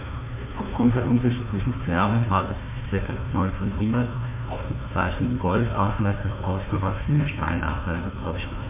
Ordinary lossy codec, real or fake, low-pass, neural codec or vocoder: MP3, 32 kbps; fake; 3.6 kHz; codec, 16 kHz, 1 kbps, FunCodec, trained on Chinese and English, 50 frames a second